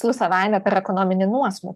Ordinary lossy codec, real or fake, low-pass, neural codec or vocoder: MP3, 96 kbps; fake; 14.4 kHz; codec, 44.1 kHz, 7.8 kbps, DAC